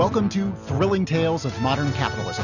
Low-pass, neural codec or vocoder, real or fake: 7.2 kHz; none; real